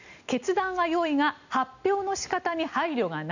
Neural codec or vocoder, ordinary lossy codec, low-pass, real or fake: none; none; 7.2 kHz; real